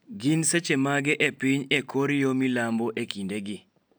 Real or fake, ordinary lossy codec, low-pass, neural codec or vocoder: real; none; none; none